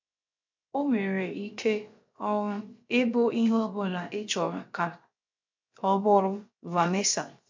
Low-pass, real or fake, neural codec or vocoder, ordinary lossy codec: 7.2 kHz; fake; codec, 16 kHz, 0.7 kbps, FocalCodec; MP3, 48 kbps